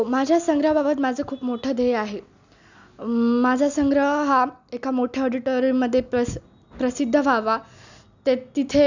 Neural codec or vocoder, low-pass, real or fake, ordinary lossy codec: none; 7.2 kHz; real; none